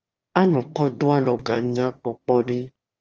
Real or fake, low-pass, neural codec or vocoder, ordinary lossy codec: fake; 7.2 kHz; autoencoder, 22.05 kHz, a latent of 192 numbers a frame, VITS, trained on one speaker; Opus, 24 kbps